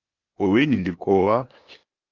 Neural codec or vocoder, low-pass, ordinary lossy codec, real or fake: codec, 16 kHz, 0.8 kbps, ZipCodec; 7.2 kHz; Opus, 24 kbps; fake